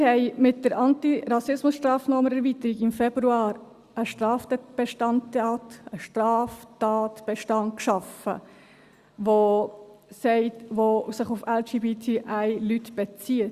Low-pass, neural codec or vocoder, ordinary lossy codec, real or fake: 14.4 kHz; none; Opus, 64 kbps; real